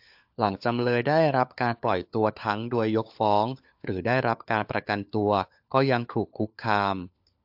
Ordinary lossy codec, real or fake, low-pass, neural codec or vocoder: AAC, 48 kbps; fake; 5.4 kHz; codec, 16 kHz, 4 kbps, FunCodec, trained on LibriTTS, 50 frames a second